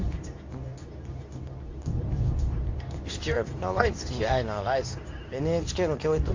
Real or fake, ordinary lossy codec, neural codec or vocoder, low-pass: fake; AAC, 48 kbps; codec, 24 kHz, 0.9 kbps, WavTokenizer, medium speech release version 2; 7.2 kHz